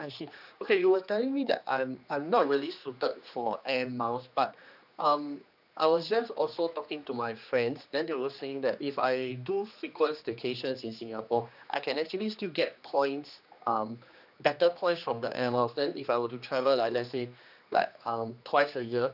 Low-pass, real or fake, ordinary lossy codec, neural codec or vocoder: 5.4 kHz; fake; none; codec, 16 kHz, 2 kbps, X-Codec, HuBERT features, trained on general audio